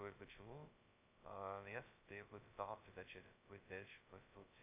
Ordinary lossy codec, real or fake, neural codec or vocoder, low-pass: AAC, 32 kbps; fake; codec, 16 kHz, 0.2 kbps, FocalCodec; 3.6 kHz